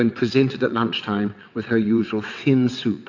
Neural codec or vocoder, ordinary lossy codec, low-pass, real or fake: vocoder, 22.05 kHz, 80 mel bands, WaveNeXt; AAC, 48 kbps; 7.2 kHz; fake